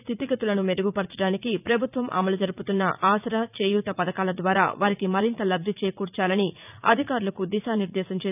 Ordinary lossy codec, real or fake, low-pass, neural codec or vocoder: none; fake; 3.6 kHz; vocoder, 44.1 kHz, 80 mel bands, Vocos